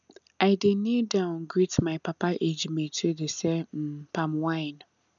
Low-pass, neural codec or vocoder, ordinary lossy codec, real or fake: 7.2 kHz; none; none; real